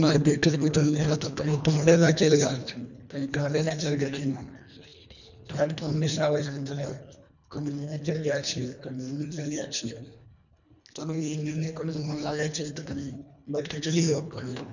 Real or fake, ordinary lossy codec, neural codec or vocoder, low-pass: fake; none; codec, 24 kHz, 1.5 kbps, HILCodec; 7.2 kHz